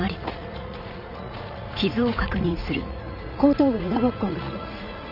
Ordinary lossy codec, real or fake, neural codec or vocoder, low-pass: none; fake; vocoder, 44.1 kHz, 80 mel bands, Vocos; 5.4 kHz